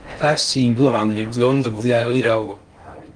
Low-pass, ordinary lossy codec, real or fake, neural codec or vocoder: 9.9 kHz; Opus, 32 kbps; fake; codec, 16 kHz in and 24 kHz out, 0.6 kbps, FocalCodec, streaming, 4096 codes